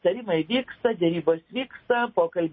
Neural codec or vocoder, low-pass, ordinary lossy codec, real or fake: none; 7.2 kHz; MP3, 24 kbps; real